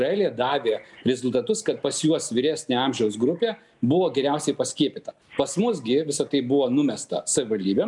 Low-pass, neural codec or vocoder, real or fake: 10.8 kHz; none; real